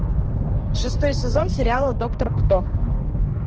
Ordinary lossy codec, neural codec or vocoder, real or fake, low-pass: Opus, 16 kbps; codec, 16 kHz, 2 kbps, X-Codec, HuBERT features, trained on balanced general audio; fake; 7.2 kHz